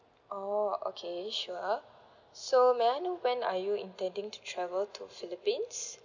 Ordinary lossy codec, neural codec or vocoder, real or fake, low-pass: none; none; real; 7.2 kHz